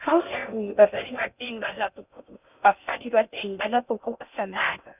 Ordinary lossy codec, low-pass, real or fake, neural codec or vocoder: none; 3.6 kHz; fake; codec, 16 kHz in and 24 kHz out, 0.6 kbps, FocalCodec, streaming, 4096 codes